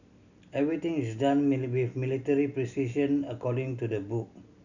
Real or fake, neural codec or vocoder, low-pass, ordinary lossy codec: real; none; 7.2 kHz; none